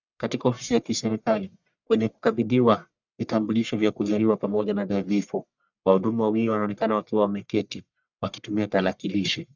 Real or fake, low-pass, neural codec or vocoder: fake; 7.2 kHz; codec, 44.1 kHz, 1.7 kbps, Pupu-Codec